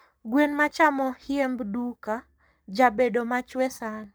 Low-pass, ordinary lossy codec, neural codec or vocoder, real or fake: none; none; codec, 44.1 kHz, 7.8 kbps, Pupu-Codec; fake